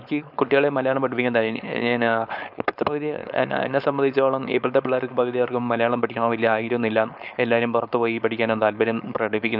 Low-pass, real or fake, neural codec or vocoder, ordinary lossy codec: 5.4 kHz; fake; codec, 16 kHz, 4.8 kbps, FACodec; none